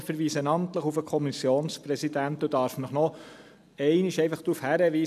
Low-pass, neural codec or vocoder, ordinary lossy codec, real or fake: 14.4 kHz; none; none; real